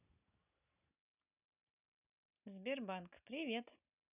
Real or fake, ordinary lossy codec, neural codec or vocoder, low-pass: real; none; none; 3.6 kHz